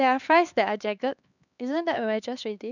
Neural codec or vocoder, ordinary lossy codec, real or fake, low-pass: codec, 16 kHz, 2 kbps, X-Codec, HuBERT features, trained on LibriSpeech; none; fake; 7.2 kHz